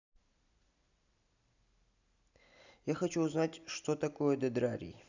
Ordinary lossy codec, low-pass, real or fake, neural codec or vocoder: none; 7.2 kHz; real; none